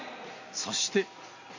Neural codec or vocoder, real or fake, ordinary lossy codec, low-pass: none; real; MP3, 48 kbps; 7.2 kHz